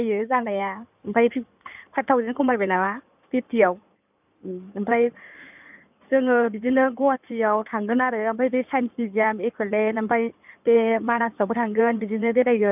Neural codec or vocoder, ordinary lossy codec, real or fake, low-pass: codec, 16 kHz in and 24 kHz out, 2.2 kbps, FireRedTTS-2 codec; none; fake; 3.6 kHz